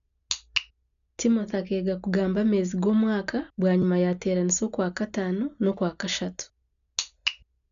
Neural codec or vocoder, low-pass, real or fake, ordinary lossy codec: none; 7.2 kHz; real; none